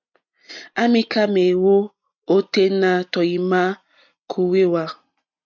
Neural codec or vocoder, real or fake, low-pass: none; real; 7.2 kHz